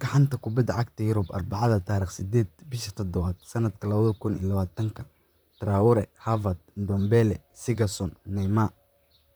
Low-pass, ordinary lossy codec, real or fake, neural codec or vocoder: none; none; fake; vocoder, 44.1 kHz, 128 mel bands, Pupu-Vocoder